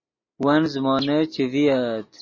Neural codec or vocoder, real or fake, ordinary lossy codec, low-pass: none; real; MP3, 32 kbps; 7.2 kHz